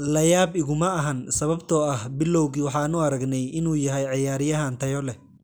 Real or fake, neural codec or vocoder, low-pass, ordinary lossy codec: real; none; none; none